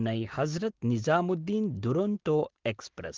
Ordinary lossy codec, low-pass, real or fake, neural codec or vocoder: Opus, 16 kbps; 7.2 kHz; real; none